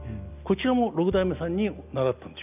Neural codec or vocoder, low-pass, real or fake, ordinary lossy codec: none; 3.6 kHz; real; none